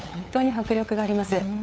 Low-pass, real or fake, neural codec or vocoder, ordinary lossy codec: none; fake; codec, 16 kHz, 8 kbps, FunCodec, trained on LibriTTS, 25 frames a second; none